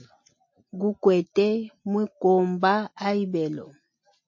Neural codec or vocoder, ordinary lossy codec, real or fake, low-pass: none; MP3, 32 kbps; real; 7.2 kHz